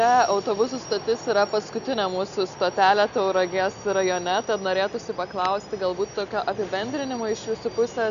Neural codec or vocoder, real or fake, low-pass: none; real; 7.2 kHz